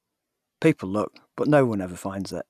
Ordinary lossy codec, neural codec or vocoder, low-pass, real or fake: none; none; 14.4 kHz; real